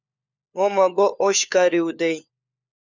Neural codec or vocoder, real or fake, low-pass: codec, 16 kHz, 4 kbps, FunCodec, trained on LibriTTS, 50 frames a second; fake; 7.2 kHz